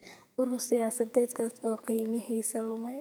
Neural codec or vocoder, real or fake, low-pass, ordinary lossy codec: codec, 44.1 kHz, 2.6 kbps, SNAC; fake; none; none